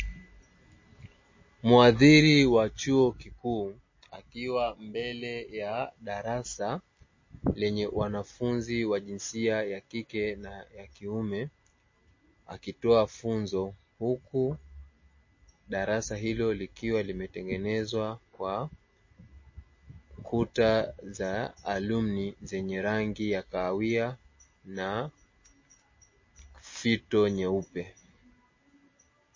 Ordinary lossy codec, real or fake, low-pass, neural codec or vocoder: MP3, 32 kbps; real; 7.2 kHz; none